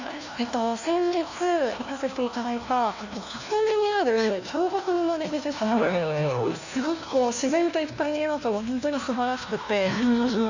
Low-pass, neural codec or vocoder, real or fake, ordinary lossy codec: 7.2 kHz; codec, 16 kHz, 1 kbps, FunCodec, trained on LibriTTS, 50 frames a second; fake; none